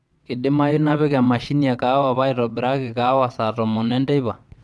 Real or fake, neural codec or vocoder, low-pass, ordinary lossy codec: fake; vocoder, 22.05 kHz, 80 mel bands, WaveNeXt; none; none